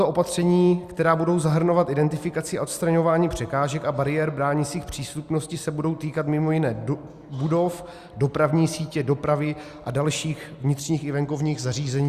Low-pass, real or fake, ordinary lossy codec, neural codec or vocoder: 14.4 kHz; real; Opus, 64 kbps; none